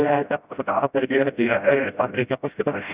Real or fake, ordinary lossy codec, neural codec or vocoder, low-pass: fake; Opus, 64 kbps; codec, 16 kHz, 0.5 kbps, FreqCodec, smaller model; 3.6 kHz